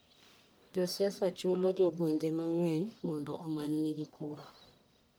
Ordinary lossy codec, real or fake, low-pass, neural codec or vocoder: none; fake; none; codec, 44.1 kHz, 1.7 kbps, Pupu-Codec